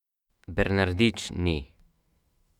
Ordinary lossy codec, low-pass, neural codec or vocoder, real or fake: Opus, 64 kbps; 19.8 kHz; autoencoder, 48 kHz, 128 numbers a frame, DAC-VAE, trained on Japanese speech; fake